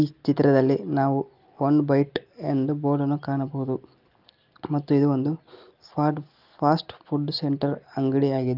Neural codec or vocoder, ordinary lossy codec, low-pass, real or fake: none; Opus, 32 kbps; 5.4 kHz; real